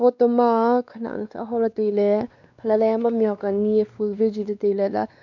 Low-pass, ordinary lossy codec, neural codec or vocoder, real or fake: 7.2 kHz; none; codec, 16 kHz, 2 kbps, X-Codec, WavLM features, trained on Multilingual LibriSpeech; fake